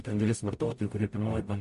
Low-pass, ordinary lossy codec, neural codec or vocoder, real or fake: 14.4 kHz; MP3, 48 kbps; codec, 44.1 kHz, 0.9 kbps, DAC; fake